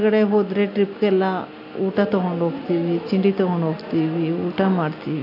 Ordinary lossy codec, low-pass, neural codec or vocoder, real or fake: AAC, 32 kbps; 5.4 kHz; none; real